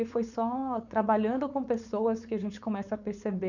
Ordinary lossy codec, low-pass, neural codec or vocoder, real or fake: none; 7.2 kHz; codec, 16 kHz, 4.8 kbps, FACodec; fake